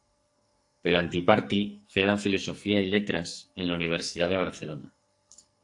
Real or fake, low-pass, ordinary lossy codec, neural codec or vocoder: fake; 10.8 kHz; AAC, 64 kbps; codec, 44.1 kHz, 2.6 kbps, SNAC